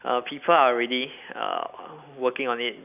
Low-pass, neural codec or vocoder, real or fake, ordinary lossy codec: 3.6 kHz; none; real; none